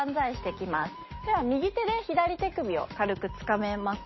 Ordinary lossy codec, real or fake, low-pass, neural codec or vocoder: MP3, 24 kbps; real; 7.2 kHz; none